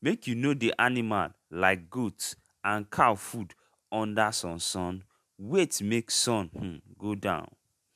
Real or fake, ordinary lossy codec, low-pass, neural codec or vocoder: real; MP3, 96 kbps; 14.4 kHz; none